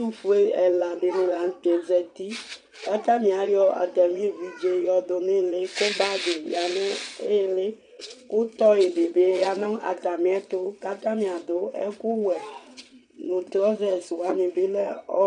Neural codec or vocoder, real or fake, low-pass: vocoder, 22.05 kHz, 80 mel bands, Vocos; fake; 9.9 kHz